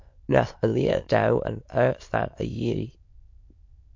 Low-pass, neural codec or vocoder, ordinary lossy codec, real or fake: 7.2 kHz; autoencoder, 22.05 kHz, a latent of 192 numbers a frame, VITS, trained on many speakers; MP3, 48 kbps; fake